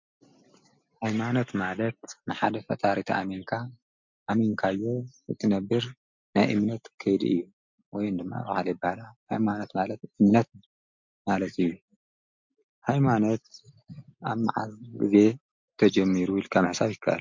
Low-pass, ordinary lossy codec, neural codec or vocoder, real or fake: 7.2 kHz; MP3, 64 kbps; none; real